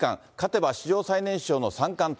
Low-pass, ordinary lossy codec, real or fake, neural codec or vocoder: none; none; real; none